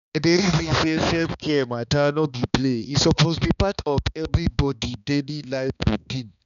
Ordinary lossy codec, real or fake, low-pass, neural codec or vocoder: none; fake; 7.2 kHz; codec, 16 kHz, 2 kbps, X-Codec, HuBERT features, trained on balanced general audio